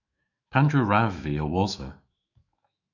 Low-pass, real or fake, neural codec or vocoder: 7.2 kHz; fake; autoencoder, 48 kHz, 128 numbers a frame, DAC-VAE, trained on Japanese speech